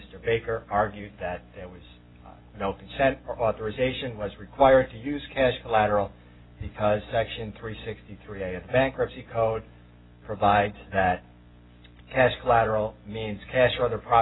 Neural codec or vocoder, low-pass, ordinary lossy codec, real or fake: none; 7.2 kHz; AAC, 16 kbps; real